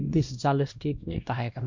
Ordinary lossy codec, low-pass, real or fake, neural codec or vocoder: MP3, 64 kbps; 7.2 kHz; fake; codec, 16 kHz, 1 kbps, X-Codec, WavLM features, trained on Multilingual LibriSpeech